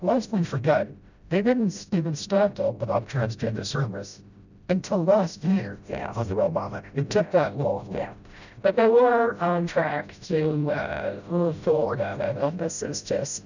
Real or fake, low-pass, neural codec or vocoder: fake; 7.2 kHz; codec, 16 kHz, 0.5 kbps, FreqCodec, smaller model